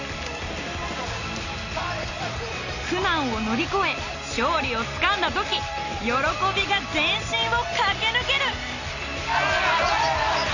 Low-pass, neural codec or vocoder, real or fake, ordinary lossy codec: 7.2 kHz; none; real; none